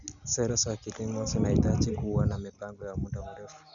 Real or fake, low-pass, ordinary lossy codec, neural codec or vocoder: real; 7.2 kHz; none; none